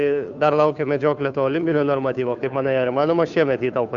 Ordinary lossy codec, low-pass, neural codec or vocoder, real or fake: MP3, 96 kbps; 7.2 kHz; codec, 16 kHz, 2 kbps, FunCodec, trained on Chinese and English, 25 frames a second; fake